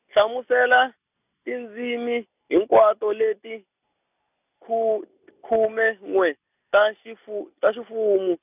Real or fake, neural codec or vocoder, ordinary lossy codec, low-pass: real; none; none; 3.6 kHz